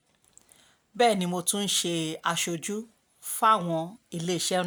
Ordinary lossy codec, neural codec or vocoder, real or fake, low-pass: none; none; real; none